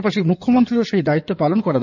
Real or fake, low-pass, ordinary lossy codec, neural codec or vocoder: fake; 7.2 kHz; none; codec, 16 kHz in and 24 kHz out, 2.2 kbps, FireRedTTS-2 codec